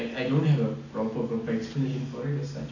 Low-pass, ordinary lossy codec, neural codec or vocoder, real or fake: 7.2 kHz; none; none; real